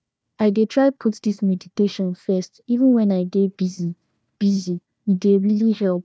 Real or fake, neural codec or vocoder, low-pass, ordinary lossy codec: fake; codec, 16 kHz, 1 kbps, FunCodec, trained on Chinese and English, 50 frames a second; none; none